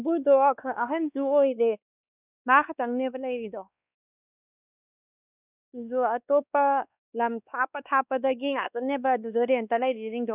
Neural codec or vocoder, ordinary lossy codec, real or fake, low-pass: codec, 16 kHz, 2 kbps, X-Codec, WavLM features, trained on Multilingual LibriSpeech; none; fake; 3.6 kHz